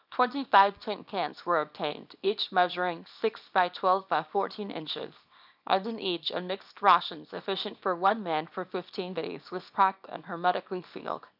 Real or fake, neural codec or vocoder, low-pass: fake; codec, 24 kHz, 0.9 kbps, WavTokenizer, small release; 5.4 kHz